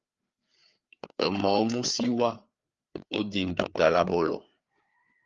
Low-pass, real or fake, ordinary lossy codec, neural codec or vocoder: 7.2 kHz; fake; Opus, 32 kbps; codec, 16 kHz, 4 kbps, FreqCodec, larger model